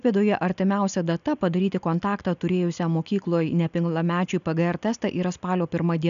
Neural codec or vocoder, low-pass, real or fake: none; 7.2 kHz; real